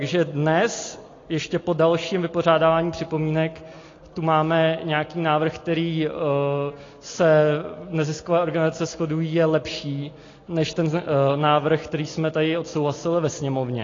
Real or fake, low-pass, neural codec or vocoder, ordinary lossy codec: real; 7.2 kHz; none; AAC, 32 kbps